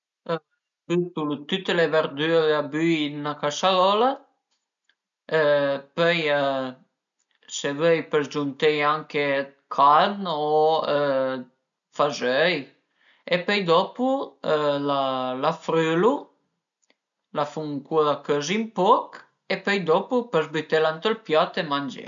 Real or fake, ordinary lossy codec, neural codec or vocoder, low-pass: real; none; none; 7.2 kHz